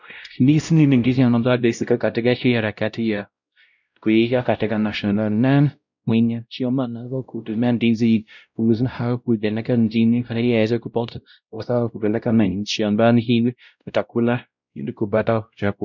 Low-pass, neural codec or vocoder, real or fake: 7.2 kHz; codec, 16 kHz, 0.5 kbps, X-Codec, WavLM features, trained on Multilingual LibriSpeech; fake